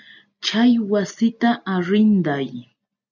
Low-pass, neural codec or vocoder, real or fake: 7.2 kHz; none; real